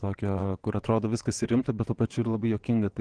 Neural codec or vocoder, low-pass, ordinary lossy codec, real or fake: vocoder, 22.05 kHz, 80 mel bands, WaveNeXt; 9.9 kHz; Opus, 16 kbps; fake